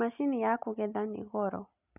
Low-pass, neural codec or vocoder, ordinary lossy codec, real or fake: 3.6 kHz; none; none; real